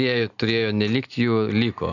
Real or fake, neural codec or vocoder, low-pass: real; none; 7.2 kHz